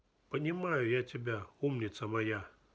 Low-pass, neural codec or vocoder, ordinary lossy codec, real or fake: none; none; none; real